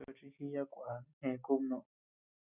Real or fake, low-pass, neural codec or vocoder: real; 3.6 kHz; none